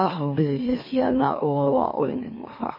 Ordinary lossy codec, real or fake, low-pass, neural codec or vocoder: MP3, 24 kbps; fake; 5.4 kHz; autoencoder, 44.1 kHz, a latent of 192 numbers a frame, MeloTTS